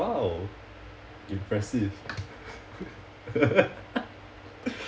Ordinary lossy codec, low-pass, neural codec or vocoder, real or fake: none; none; none; real